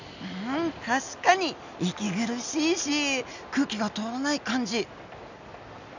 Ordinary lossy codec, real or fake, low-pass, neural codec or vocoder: none; real; 7.2 kHz; none